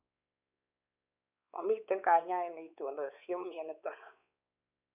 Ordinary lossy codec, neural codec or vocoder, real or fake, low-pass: none; codec, 16 kHz, 4 kbps, X-Codec, WavLM features, trained on Multilingual LibriSpeech; fake; 3.6 kHz